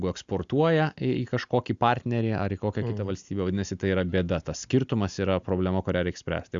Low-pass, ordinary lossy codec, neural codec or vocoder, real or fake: 7.2 kHz; Opus, 64 kbps; none; real